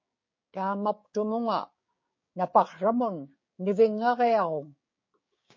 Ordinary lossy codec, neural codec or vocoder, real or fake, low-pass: MP3, 32 kbps; codec, 16 kHz, 6 kbps, DAC; fake; 7.2 kHz